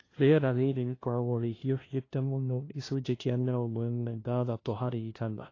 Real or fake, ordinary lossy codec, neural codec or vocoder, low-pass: fake; AAC, 32 kbps; codec, 16 kHz, 0.5 kbps, FunCodec, trained on LibriTTS, 25 frames a second; 7.2 kHz